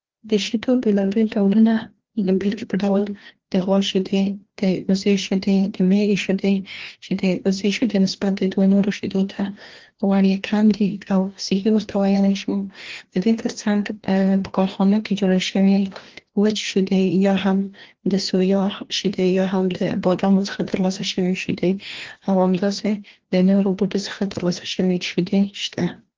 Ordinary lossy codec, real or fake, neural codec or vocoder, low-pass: Opus, 16 kbps; fake; codec, 16 kHz, 1 kbps, FreqCodec, larger model; 7.2 kHz